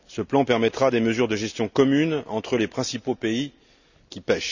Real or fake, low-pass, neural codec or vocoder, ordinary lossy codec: real; 7.2 kHz; none; none